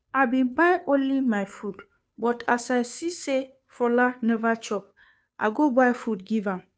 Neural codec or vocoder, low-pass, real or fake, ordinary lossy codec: codec, 16 kHz, 2 kbps, FunCodec, trained on Chinese and English, 25 frames a second; none; fake; none